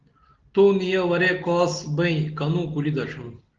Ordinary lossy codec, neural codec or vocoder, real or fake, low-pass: Opus, 16 kbps; none; real; 7.2 kHz